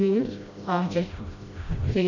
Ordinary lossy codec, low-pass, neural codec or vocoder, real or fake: none; 7.2 kHz; codec, 16 kHz, 0.5 kbps, FreqCodec, smaller model; fake